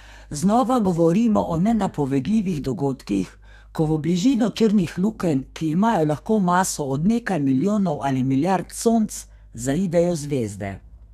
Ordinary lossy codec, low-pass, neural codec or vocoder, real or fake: none; 14.4 kHz; codec, 32 kHz, 1.9 kbps, SNAC; fake